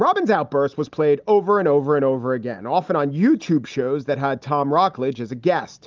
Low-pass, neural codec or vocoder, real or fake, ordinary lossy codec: 7.2 kHz; none; real; Opus, 24 kbps